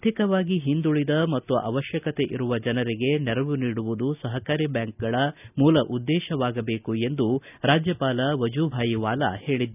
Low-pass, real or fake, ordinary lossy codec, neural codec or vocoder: 3.6 kHz; real; none; none